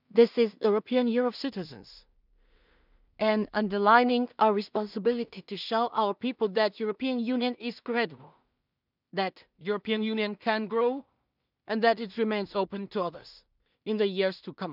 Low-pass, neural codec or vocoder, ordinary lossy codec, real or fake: 5.4 kHz; codec, 16 kHz in and 24 kHz out, 0.4 kbps, LongCat-Audio-Codec, two codebook decoder; none; fake